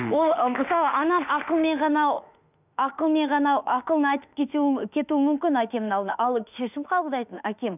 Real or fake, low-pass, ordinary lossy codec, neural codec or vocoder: fake; 3.6 kHz; none; autoencoder, 48 kHz, 32 numbers a frame, DAC-VAE, trained on Japanese speech